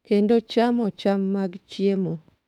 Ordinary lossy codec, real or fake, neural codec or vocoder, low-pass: none; fake; autoencoder, 48 kHz, 32 numbers a frame, DAC-VAE, trained on Japanese speech; 19.8 kHz